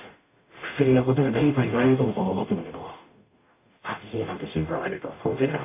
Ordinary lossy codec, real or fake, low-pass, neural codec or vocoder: AAC, 24 kbps; fake; 3.6 kHz; codec, 44.1 kHz, 0.9 kbps, DAC